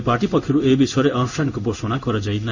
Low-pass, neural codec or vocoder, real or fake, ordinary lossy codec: 7.2 kHz; codec, 16 kHz in and 24 kHz out, 1 kbps, XY-Tokenizer; fake; none